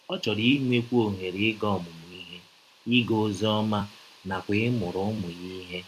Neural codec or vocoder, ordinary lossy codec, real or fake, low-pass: vocoder, 44.1 kHz, 128 mel bands every 256 samples, BigVGAN v2; MP3, 96 kbps; fake; 14.4 kHz